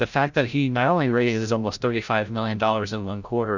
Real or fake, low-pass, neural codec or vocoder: fake; 7.2 kHz; codec, 16 kHz, 0.5 kbps, FreqCodec, larger model